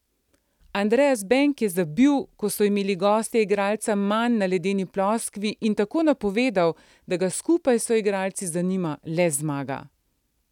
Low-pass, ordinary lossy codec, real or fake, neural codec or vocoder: 19.8 kHz; none; real; none